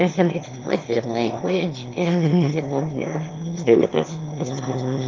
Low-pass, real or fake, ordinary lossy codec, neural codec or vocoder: 7.2 kHz; fake; Opus, 24 kbps; autoencoder, 22.05 kHz, a latent of 192 numbers a frame, VITS, trained on one speaker